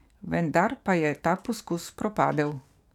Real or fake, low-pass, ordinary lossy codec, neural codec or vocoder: fake; 19.8 kHz; none; codec, 44.1 kHz, 7.8 kbps, DAC